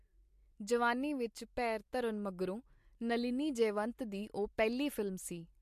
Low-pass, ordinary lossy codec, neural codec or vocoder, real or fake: 14.4 kHz; MP3, 64 kbps; none; real